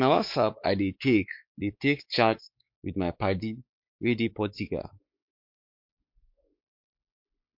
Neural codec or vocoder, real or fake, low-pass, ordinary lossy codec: codec, 16 kHz, 4 kbps, X-Codec, WavLM features, trained on Multilingual LibriSpeech; fake; 5.4 kHz; MP3, 48 kbps